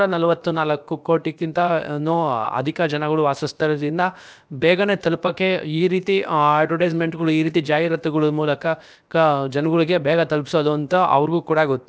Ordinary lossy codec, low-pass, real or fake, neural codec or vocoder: none; none; fake; codec, 16 kHz, about 1 kbps, DyCAST, with the encoder's durations